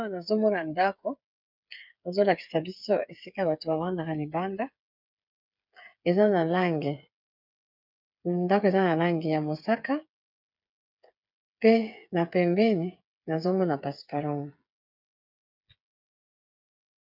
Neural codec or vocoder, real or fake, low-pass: codec, 16 kHz, 8 kbps, FreqCodec, smaller model; fake; 5.4 kHz